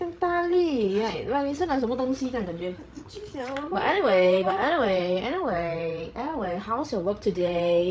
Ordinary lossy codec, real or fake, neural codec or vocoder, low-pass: none; fake; codec, 16 kHz, 8 kbps, FreqCodec, larger model; none